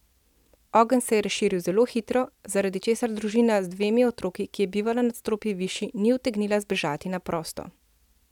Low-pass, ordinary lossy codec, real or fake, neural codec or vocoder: 19.8 kHz; none; real; none